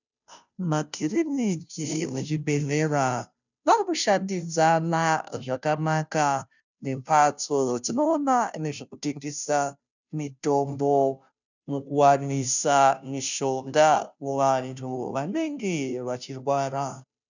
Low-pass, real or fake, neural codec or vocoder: 7.2 kHz; fake; codec, 16 kHz, 0.5 kbps, FunCodec, trained on Chinese and English, 25 frames a second